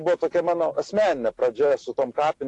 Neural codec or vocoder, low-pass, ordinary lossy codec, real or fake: none; 10.8 kHz; AAC, 48 kbps; real